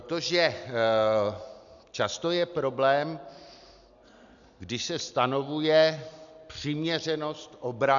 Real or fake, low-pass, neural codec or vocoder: real; 7.2 kHz; none